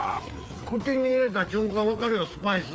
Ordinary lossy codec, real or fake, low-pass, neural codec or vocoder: none; fake; none; codec, 16 kHz, 4 kbps, FreqCodec, larger model